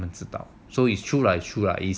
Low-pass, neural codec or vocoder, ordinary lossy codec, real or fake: none; none; none; real